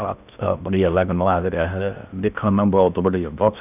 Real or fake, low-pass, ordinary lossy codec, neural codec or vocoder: fake; 3.6 kHz; none; codec, 16 kHz in and 24 kHz out, 0.6 kbps, FocalCodec, streaming, 2048 codes